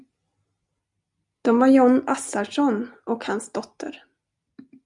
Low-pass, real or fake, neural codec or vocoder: 10.8 kHz; real; none